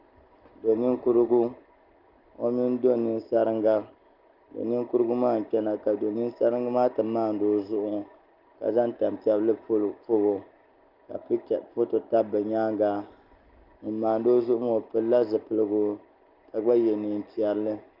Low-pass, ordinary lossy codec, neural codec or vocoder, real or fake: 5.4 kHz; Opus, 24 kbps; none; real